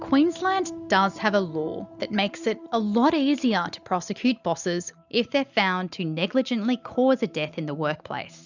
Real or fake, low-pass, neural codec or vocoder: real; 7.2 kHz; none